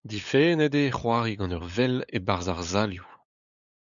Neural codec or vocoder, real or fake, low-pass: codec, 16 kHz, 8 kbps, FunCodec, trained on LibriTTS, 25 frames a second; fake; 7.2 kHz